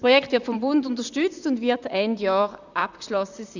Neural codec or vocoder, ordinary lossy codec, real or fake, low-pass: vocoder, 44.1 kHz, 128 mel bands, Pupu-Vocoder; none; fake; 7.2 kHz